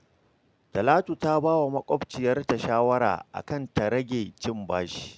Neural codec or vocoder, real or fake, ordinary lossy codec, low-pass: none; real; none; none